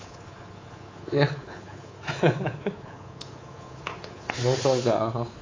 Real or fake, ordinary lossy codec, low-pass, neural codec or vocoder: fake; AAC, 32 kbps; 7.2 kHz; codec, 24 kHz, 3.1 kbps, DualCodec